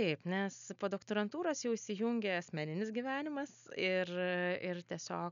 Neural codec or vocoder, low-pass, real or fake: none; 7.2 kHz; real